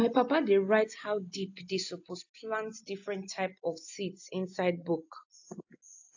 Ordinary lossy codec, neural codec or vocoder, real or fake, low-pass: AAC, 48 kbps; none; real; 7.2 kHz